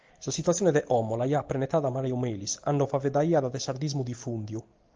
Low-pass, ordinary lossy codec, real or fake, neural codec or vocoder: 7.2 kHz; Opus, 24 kbps; real; none